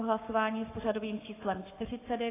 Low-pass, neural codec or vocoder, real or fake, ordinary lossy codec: 3.6 kHz; codec, 44.1 kHz, 7.8 kbps, Pupu-Codec; fake; AAC, 16 kbps